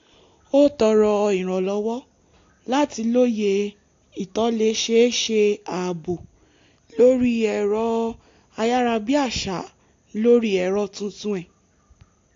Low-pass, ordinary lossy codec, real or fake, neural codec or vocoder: 7.2 kHz; AAC, 48 kbps; real; none